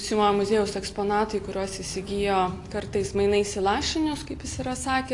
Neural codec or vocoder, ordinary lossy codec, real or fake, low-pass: none; AAC, 48 kbps; real; 10.8 kHz